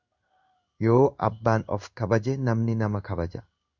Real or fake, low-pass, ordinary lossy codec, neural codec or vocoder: fake; 7.2 kHz; Opus, 64 kbps; codec, 16 kHz in and 24 kHz out, 1 kbps, XY-Tokenizer